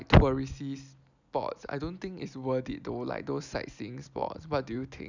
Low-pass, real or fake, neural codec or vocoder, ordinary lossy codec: 7.2 kHz; real; none; none